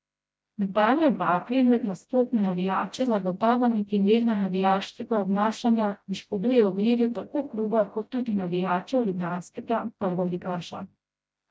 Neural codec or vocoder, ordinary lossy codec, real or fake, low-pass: codec, 16 kHz, 0.5 kbps, FreqCodec, smaller model; none; fake; none